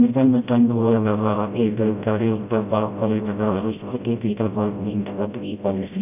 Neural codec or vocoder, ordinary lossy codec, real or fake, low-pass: codec, 16 kHz, 0.5 kbps, FreqCodec, smaller model; none; fake; 3.6 kHz